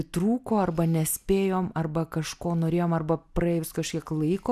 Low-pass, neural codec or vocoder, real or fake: 14.4 kHz; none; real